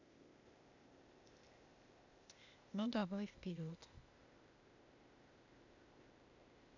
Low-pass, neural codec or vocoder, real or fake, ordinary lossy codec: 7.2 kHz; codec, 16 kHz, 0.8 kbps, ZipCodec; fake; none